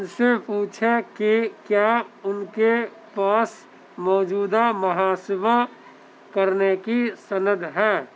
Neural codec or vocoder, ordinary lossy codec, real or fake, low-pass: none; none; real; none